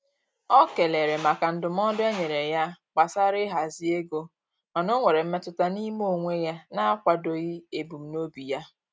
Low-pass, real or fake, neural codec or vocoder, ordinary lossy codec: none; real; none; none